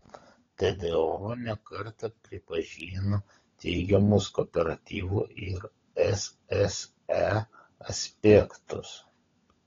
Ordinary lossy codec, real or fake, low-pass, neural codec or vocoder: AAC, 32 kbps; fake; 7.2 kHz; codec, 16 kHz, 16 kbps, FunCodec, trained on LibriTTS, 50 frames a second